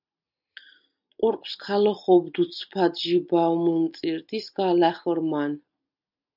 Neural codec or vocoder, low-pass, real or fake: none; 5.4 kHz; real